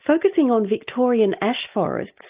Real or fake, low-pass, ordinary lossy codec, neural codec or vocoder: real; 3.6 kHz; Opus, 24 kbps; none